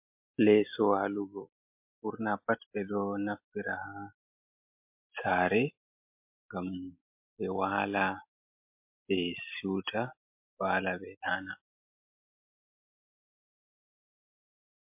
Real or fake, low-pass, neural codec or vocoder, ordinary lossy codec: real; 3.6 kHz; none; MP3, 32 kbps